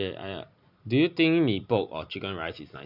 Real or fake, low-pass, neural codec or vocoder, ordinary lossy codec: real; 5.4 kHz; none; none